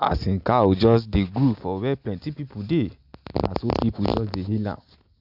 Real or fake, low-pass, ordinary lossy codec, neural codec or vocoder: real; 5.4 kHz; AAC, 48 kbps; none